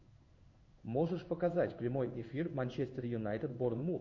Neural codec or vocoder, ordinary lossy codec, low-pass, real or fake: codec, 16 kHz in and 24 kHz out, 1 kbps, XY-Tokenizer; MP3, 48 kbps; 7.2 kHz; fake